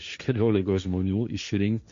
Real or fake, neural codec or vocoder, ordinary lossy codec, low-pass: fake; codec, 16 kHz, 1.1 kbps, Voila-Tokenizer; MP3, 48 kbps; 7.2 kHz